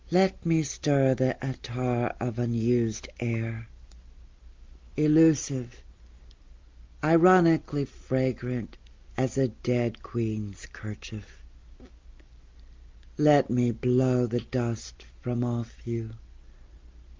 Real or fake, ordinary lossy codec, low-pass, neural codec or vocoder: real; Opus, 32 kbps; 7.2 kHz; none